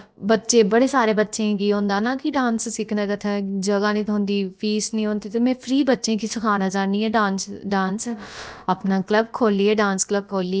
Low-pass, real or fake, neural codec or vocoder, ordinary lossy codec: none; fake; codec, 16 kHz, about 1 kbps, DyCAST, with the encoder's durations; none